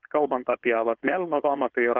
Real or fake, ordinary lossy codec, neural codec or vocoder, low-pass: fake; Opus, 24 kbps; codec, 16 kHz, 4.8 kbps, FACodec; 7.2 kHz